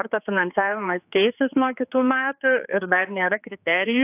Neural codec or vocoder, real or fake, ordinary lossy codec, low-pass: codec, 16 kHz, 4 kbps, X-Codec, HuBERT features, trained on general audio; fake; AAC, 32 kbps; 3.6 kHz